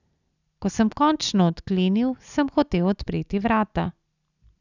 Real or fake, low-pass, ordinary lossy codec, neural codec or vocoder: real; 7.2 kHz; none; none